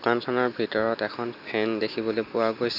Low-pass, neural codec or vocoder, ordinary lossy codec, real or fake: 5.4 kHz; none; none; real